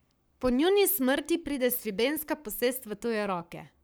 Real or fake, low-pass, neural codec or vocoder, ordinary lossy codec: fake; none; codec, 44.1 kHz, 7.8 kbps, Pupu-Codec; none